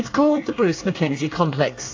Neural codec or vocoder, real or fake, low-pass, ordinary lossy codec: codec, 24 kHz, 1 kbps, SNAC; fake; 7.2 kHz; AAC, 48 kbps